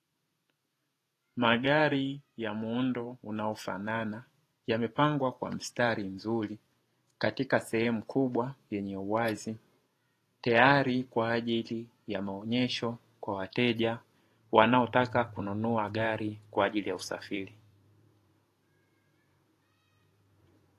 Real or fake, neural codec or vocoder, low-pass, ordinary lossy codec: fake; vocoder, 48 kHz, 128 mel bands, Vocos; 14.4 kHz; AAC, 48 kbps